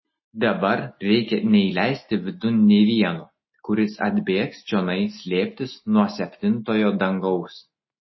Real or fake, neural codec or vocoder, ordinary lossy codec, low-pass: real; none; MP3, 24 kbps; 7.2 kHz